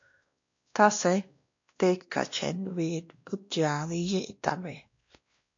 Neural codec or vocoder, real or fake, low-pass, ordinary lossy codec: codec, 16 kHz, 1 kbps, X-Codec, WavLM features, trained on Multilingual LibriSpeech; fake; 7.2 kHz; AAC, 48 kbps